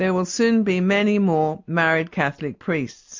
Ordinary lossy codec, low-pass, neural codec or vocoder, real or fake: MP3, 48 kbps; 7.2 kHz; none; real